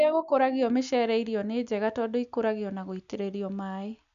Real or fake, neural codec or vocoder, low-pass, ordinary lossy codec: real; none; 7.2 kHz; none